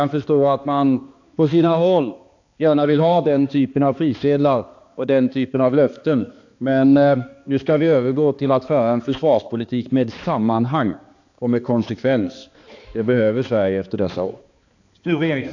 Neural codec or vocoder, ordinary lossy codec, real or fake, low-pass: codec, 16 kHz, 2 kbps, X-Codec, HuBERT features, trained on balanced general audio; AAC, 48 kbps; fake; 7.2 kHz